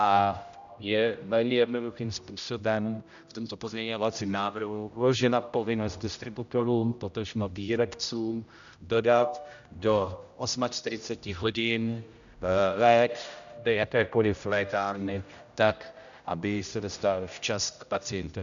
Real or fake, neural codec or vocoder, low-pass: fake; codec, 16 kHz, 0.5 kbps, X-Codec, HuBERT features, trained on general audio; 7.2 kHz